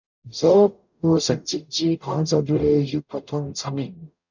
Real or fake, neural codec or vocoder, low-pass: fake; codec, 44.1 kHz, 0.9 kbps, DAC; 7.2 kHz